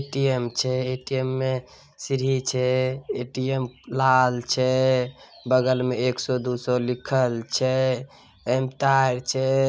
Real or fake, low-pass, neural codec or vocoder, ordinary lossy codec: real; none; none; none